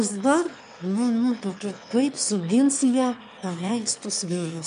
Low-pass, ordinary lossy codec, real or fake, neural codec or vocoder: 9.9 kHz; MP3, 96 kbps; fake; autoencoder, 22.05 kHz, a latent of 192 numbers a frame, VITS, trained on one speaker